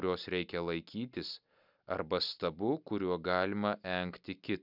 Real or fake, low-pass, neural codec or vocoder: real; 5.4 kHz; none